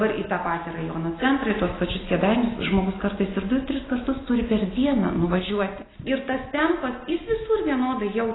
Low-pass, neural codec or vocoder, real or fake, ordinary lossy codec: 7.2 kHz; none; real; AAC, 16 kbps